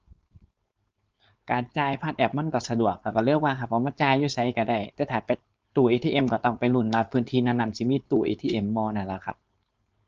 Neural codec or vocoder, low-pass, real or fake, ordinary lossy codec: codec, 16 kHz, 4.8 kbps, FACodec; 7.2 kHz; fake; Opus, 24 kbps